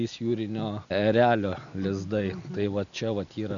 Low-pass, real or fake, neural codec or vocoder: 7.2 kHz; real; none